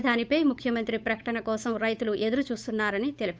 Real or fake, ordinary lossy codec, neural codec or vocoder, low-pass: fake; none; codec, 16 kHz, 8 kbps, FunCodec, trained on Chinese and English, 25 frames a second; none